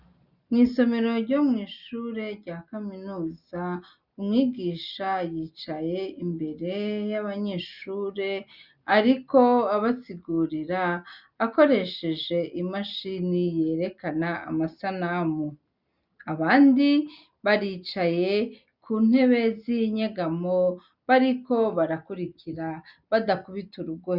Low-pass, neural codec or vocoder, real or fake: 5.4 kHz; none; real